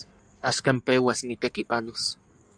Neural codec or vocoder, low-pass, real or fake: codec, 16 kHz in and 24 kHz out, 1.1 kbps, FireRedTTS-2 codec; 9.9 kHz; fake